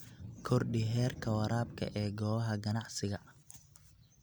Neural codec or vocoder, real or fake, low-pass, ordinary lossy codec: none; real; none; none